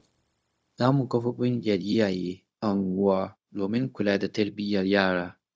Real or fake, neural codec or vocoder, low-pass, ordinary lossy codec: fake; codec, 16 kHz, 0.4 kbps, LongCat-Audio-Codec; none; none